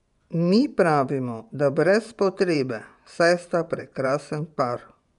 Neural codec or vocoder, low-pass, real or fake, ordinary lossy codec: vocoder, 24 kHz, 100 mel bands, Vocos; 10.8 kHz; fake; none